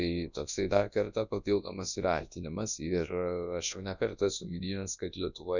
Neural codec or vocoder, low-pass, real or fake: codec, 24 kHz, 0.9 kbps, WavTokenizer, large speech release; 7.2 kHz; fake